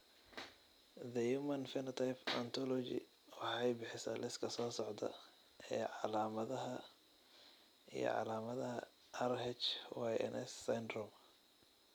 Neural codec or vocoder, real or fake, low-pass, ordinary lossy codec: none; real; none; none